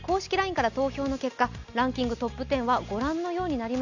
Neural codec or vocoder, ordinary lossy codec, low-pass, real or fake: none; none; 7.2 kHz; real